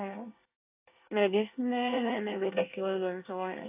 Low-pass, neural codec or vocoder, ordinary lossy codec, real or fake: 3.6 kHz; codec, 24 kHz, 1 kbps, SNAC; AAC, 24 kbps; fake